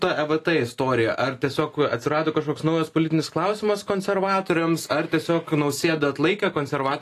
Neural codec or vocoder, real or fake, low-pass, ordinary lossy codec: none; real; 14.4 kHz; AAC, 48 kbps